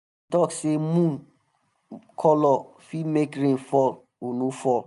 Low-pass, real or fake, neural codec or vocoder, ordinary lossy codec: 10.8 kHz; real; none; none